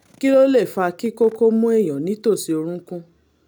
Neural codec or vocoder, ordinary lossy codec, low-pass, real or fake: none; none; none; real